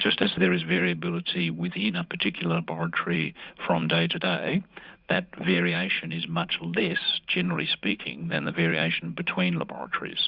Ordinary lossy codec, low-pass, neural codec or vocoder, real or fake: Opus, 64 kbps; 5.4 kHz; vocoder, 44.1 kHz, 80 mel bands, Vocos; fake